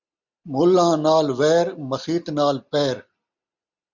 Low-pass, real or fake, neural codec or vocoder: 7.2 kHz; real; none